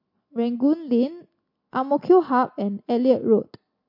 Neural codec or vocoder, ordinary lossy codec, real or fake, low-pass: none; MP3, 32 kbps; real; 5.4 kHz